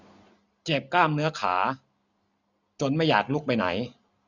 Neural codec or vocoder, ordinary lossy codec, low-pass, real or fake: none; none; 7.2 kHz; real